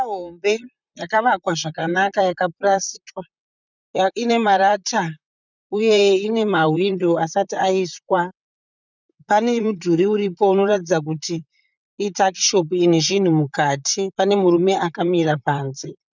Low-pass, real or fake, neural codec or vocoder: 7.2 kHz; fake; vocoder, 44.1 kHz, 128 mel bands, Pupu-Vocoder